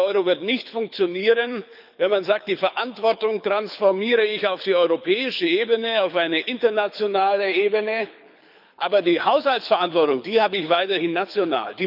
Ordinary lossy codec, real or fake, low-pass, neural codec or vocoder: none; fake; 5.4 kHz; codec, 24 kHz, 6 kbps, HILCodec